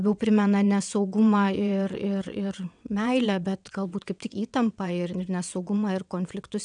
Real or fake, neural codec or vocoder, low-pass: fake; vocoder, 22.05 kHz, 80 mel bands, WaveNeXt; 9.9 kHz